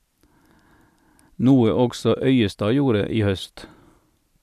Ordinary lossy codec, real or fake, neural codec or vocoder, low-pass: none; real; none; 14.4 kHz